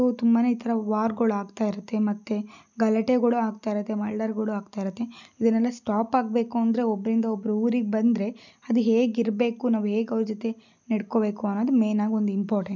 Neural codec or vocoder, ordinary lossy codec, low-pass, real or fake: none; none; 7.2 kHz; real